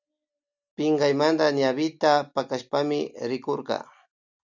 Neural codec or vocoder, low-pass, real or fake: none; 7.2 kHz; real